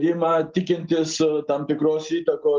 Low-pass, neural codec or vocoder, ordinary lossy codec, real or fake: 7.2 kHz; none; Opus, 32 kbps; real